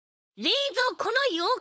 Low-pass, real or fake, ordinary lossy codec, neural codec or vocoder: none; fake; none; codec, 16 kHz, 4.8 kbps, FACodec